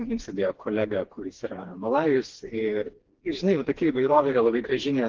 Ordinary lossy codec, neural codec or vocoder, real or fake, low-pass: Opus, 16 kbps; codec, 16 kHz, 2 kbps, FreqCodec, smaller model; fake; 7.2 kHz